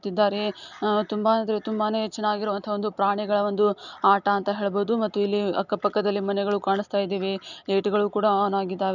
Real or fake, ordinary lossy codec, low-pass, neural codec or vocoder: real; none; 7.2 kHz; none